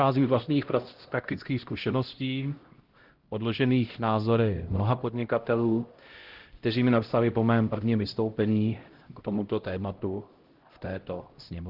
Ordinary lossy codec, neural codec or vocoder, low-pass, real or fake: Opus, 16 kbps; codec, 16 kHz, 0.5 kbps, X-Codec, HuBERT features, trained on LibriSpeech; 5.4 kHz; fake